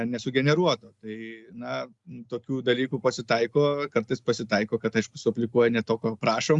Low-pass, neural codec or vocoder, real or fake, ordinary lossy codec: 10.8 kHz; none; real; Opus, 64 kbps